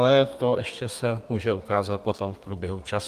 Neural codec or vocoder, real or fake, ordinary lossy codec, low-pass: codec, 32 kHz, 1.9 kbps, SNAC; fake; Opus, 32 kbps; 14.4 kHz